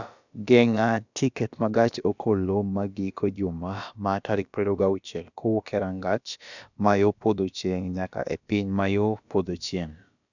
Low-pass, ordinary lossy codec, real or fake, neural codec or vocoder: 7.2 kHz; none; fake; codec, 16 kHz, about 1 kbps, DyCAST, with the encoder's durations